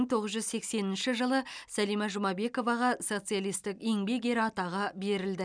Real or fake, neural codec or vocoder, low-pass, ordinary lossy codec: real; none; 9.9 kHz; none